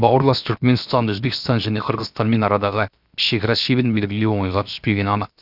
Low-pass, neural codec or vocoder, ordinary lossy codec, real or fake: 5.4 kHz; codec, 16 kHz, 0.7 kbps, FocalCodec; none; fake